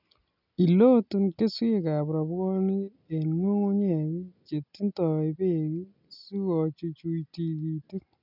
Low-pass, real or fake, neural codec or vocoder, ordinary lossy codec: 5.4 kHz; real; none; none